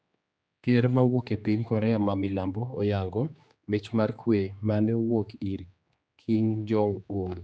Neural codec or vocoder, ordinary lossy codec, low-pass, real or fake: codec, 16 kHz, 2 kbps, X-Codec, HuBERT features, trained on general audio; none; none; fake